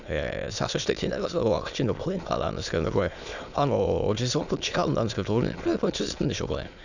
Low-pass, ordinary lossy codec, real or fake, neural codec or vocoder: 7.2 kHz; none; fake; autoencoder, 22.05 kHz, a latent of 192 numbers a frame, VITS, trained on many speakers